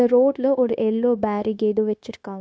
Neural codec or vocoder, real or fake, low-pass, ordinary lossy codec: codec, 16 kHz, 0.9 kbps, LongCat-Audio-Codec; fake; none; none